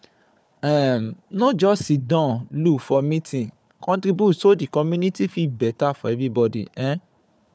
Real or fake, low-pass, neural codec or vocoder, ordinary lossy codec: fake; none; codec, 16 kHz, 4 kbps, FunCodec, trained on Chinese and English, 50 frames a second; none